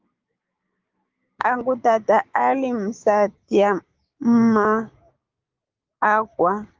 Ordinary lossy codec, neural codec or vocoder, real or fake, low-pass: Opus, 32 kbps; codec, 16 kHz, 6 kbps, DAC; fake; 7.2 kHz